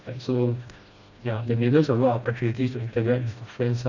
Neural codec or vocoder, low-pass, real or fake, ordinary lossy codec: codec, 16 kHz, 1 kbps, FreqCodec, smaller model; 7.2 kHz; fake; none